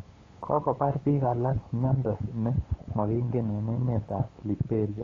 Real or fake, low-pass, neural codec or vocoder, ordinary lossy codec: fake; 7.2 kHz; codec, 16 kHz, 8 kbps, FunCodec, trained on LibriTTS, 25 frames a second; AAC, 32 kbps